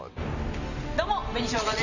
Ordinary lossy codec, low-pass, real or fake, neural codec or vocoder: none; 7.2 kHz; real; none